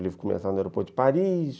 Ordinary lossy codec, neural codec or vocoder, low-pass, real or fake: none; none; none; real